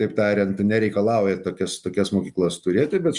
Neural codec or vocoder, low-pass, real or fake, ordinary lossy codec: none; 10.8 kHz; real; Opus, 64 kbps